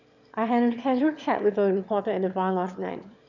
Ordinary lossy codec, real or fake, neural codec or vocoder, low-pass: none; fake; autoencoder, 22.05 kHz, a latent of 192 numbers a frame, VITS, trained on one speaker; 7.2 kHz